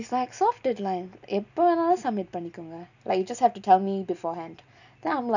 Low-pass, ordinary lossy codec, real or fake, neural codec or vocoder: 7.2 kHz; none; real; none